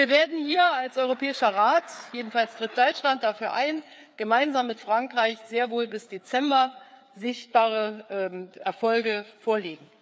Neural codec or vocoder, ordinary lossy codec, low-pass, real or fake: codec, 16 kHz, 4 kbps, FreqCodec, larger model; none; none; fake